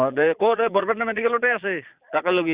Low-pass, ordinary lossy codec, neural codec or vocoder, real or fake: 3.6 kHz; Opus, 64 kbps; vocoder, 22.05 kHz, 80 mel bands, Vocos; fake